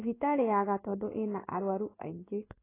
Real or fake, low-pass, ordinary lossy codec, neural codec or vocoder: fake; 3.6 kHz; AAC, 16 kbps; codec, 16 kHz in and 24 kHz out, 1 kbps, XY-Tokenizer